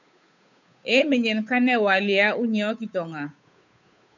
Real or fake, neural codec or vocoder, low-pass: fake; codec, 16 kHz, 8 kbps, FunCodec, trained on Chinese and English, 25 frames a second; 7.2 kHz